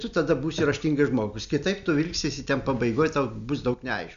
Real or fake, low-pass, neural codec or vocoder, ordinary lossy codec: real; 7.2 kHz; none; AAC, 96 kbps